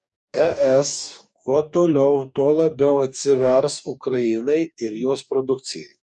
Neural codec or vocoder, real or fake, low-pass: codec, 44.1 kHz, 2.6 kbps, DAC; fake; 10.8 kHz